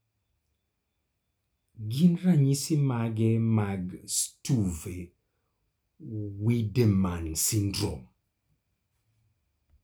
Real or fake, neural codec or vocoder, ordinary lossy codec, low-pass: real; none; none; none